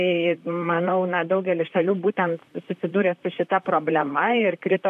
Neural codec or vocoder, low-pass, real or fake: vocoder, 44.1 kHz, 128 mel bands, Pupu-Vocoder; 14.4 kHz; fake